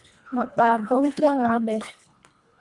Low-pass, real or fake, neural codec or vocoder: 10.8 kHz; fake; codec, 24 kHz, 1.5 kbps, HILCodec